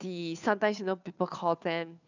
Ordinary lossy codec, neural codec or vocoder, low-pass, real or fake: none; codec, 16 kHz, 2 kbps, FunCodec, trained on Chinese and English, 25 frames a second; 7.2 kHz; fake